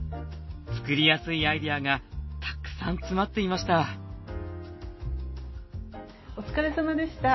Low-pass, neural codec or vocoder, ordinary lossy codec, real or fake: 7.2 kHz; none; MP3, 24 kbps; real